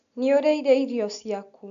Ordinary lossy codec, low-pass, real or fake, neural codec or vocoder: none; 7.2 kHz; real; none